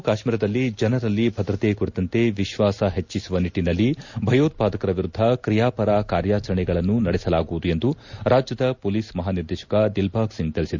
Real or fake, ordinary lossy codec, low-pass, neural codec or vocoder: real; Opus, 64 kbps; 7.2 kHz; none